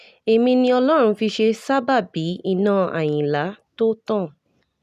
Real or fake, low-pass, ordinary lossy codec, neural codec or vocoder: real; 14.4 kHz; none; none